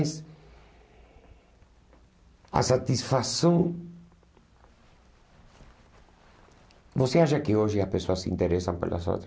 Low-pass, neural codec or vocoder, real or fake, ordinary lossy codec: none; none; real; none